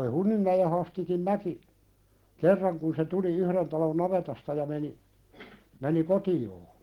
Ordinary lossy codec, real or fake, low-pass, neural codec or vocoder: Opus, 16 kbps; real; 19.8 kHz; none